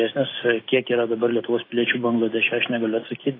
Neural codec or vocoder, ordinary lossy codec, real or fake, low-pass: none; AAC, 24 kbps; real; 5.4 kHz